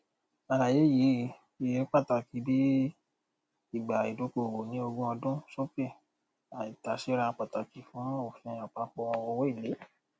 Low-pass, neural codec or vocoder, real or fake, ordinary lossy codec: none; none; real; none